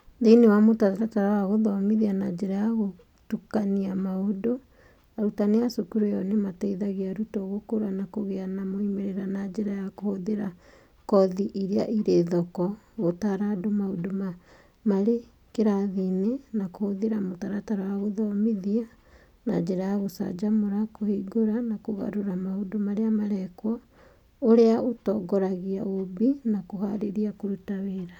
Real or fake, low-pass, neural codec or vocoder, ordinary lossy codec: real; 19.8 kHz; none; none